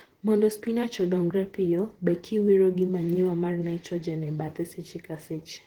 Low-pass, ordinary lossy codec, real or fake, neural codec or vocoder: 19.8 kHz; Opus, 16 kbps; fake; vocoder, 44.1 kHz, 128 mel bands, Pupu-Vocoder